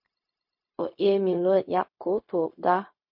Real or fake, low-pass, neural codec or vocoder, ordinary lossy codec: fake; 5.4 kHz; codec, 16 kHz, 0.4 kbps, LongCat-Audio-Codec; MP3, 32 kbps